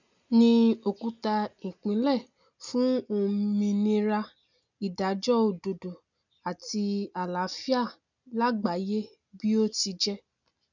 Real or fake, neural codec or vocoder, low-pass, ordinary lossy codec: real; none; 7.2 kHz; none